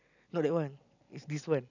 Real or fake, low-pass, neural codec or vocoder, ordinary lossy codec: real; 7.2 kHz; none; none